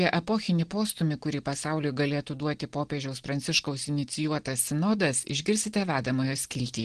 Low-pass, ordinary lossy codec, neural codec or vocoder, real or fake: 9.9 kHz; Opus, 16 kbps; none; real